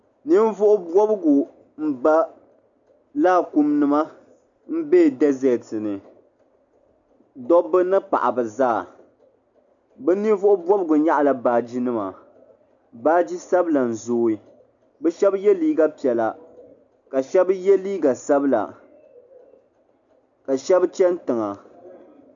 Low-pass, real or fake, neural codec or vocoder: 7.2 kHz; real; none